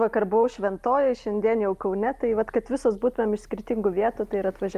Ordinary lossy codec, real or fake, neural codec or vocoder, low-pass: Opus, 32 kbps; real; none; 14.4 kHz